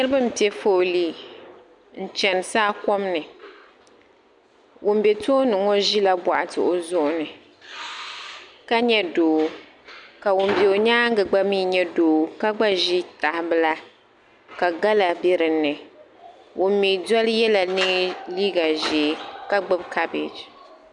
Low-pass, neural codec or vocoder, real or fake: 10.8 kHz; none; real